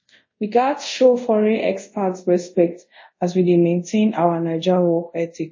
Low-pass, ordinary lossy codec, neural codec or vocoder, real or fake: 7.2 kHz; MP3, 32 kbps; codec, 24 kHz, 0.5 kbps, DualCodec; fake